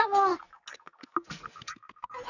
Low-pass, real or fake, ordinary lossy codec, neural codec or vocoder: 7.2 kHz; fake; none; codec, 44.1 kHz, 2.6 kbps, SNAC